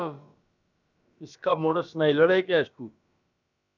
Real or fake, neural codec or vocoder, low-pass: fake; codec, 16 kHz, about 1 kbps, DyCAST, with the encoder's durations; 7.2 kHz